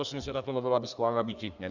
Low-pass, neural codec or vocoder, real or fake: 7.2 kHz; codec, 32 kHz, 1.9 kbps, SNAC; fake